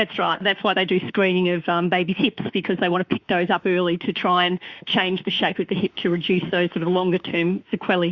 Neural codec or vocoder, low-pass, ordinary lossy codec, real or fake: autoencoder, 48 kHz, 32 numbers a frame, DAC-VAE, trained on Japanese speech; 7.2 kHz; Opus, 64 kbps; fake